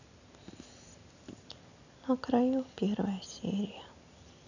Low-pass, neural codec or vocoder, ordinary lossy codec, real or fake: 7.2 kHz; none; none; real